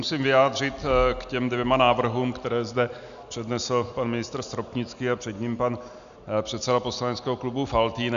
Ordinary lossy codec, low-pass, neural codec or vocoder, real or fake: MP3, 96 kbps; 7.2 kHz; none; real